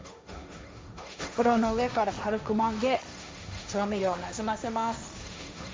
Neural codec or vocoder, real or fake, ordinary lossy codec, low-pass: codec, 16 kHz, 1.1 kbps, Voila-Tokenizer; fake; none; none